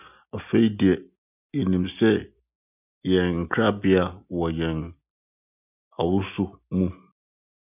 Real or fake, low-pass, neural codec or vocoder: real; 3.6 kHz; none